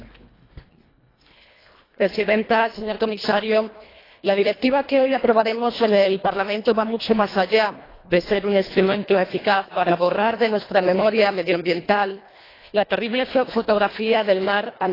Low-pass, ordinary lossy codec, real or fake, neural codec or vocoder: 5.4 kHz; AAC, 24 kbps; fake; codec, 24 kHz, 1.5 kbps, HILCodec